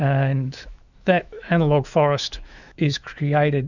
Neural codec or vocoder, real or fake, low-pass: autoencoder, 48 kHz, 128 numbers a frame, DAC-VAE, trained on Japanese speech; fake; 7.2 kHz